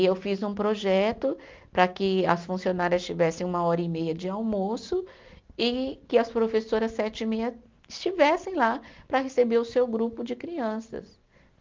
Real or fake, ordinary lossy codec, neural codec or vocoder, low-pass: real; Opus, 24 kbps; none; 7.2 kHz